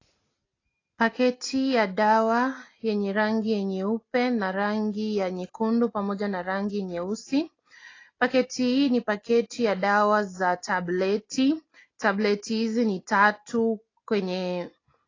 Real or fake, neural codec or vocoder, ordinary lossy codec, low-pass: real; none; AAC, 32 kbps; 7.2 kHz